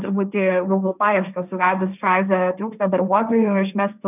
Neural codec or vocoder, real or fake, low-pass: codec, 16 kHz, 1.1 kbps, Voila-Tokenizer; fake; 3.6 kHz